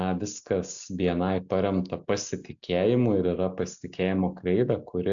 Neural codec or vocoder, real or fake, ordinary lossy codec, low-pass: none; real; AAC, 64 kbps; 7.2 kHz